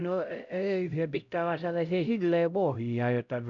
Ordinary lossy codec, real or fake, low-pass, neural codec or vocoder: none; fake; 7.2 kHz; codec, 16 kHz, 0.5 kbps, X-Codec, HuBERT features, trained on LibriSpeech